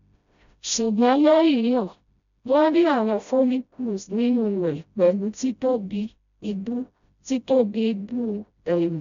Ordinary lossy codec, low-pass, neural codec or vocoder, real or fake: none; 7.2 kHz; codec, 16 kHz, 0.5 kbps, FreqCodec, smaller model; fake